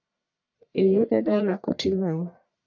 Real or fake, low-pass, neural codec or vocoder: fake; 7.2 kHz; codec, 44.1 kHz, 1.7 kbps, Pupu-Codec